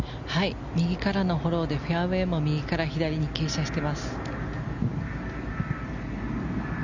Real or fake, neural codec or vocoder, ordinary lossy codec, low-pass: real; none; none; 7.2 kHz